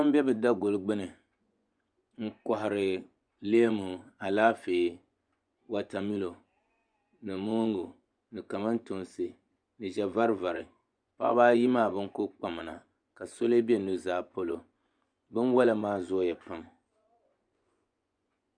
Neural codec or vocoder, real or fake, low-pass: vocoder, 24 kHz, 100 mel bands, Vocos; fake; 9.9 kHz